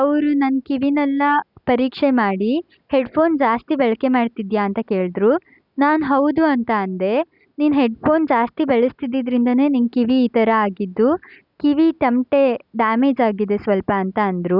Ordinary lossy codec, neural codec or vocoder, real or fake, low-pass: none; none; real; 5.4 kHz